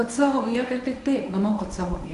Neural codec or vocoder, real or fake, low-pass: codec, 24 kHz, 0.9 kbps, WavTokenizer, medium speech release version 1; fake; 10.8 kHz